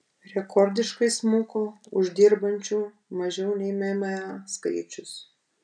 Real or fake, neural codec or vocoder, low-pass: real; none; 9.9 kHz